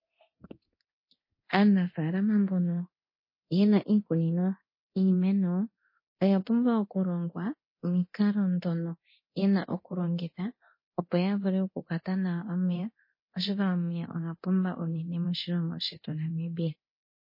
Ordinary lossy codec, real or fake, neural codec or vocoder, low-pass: MP3, 24 kbps; fake; codec, 24 kHz, 0.9 kbps, DualCodec; 5.4 kHz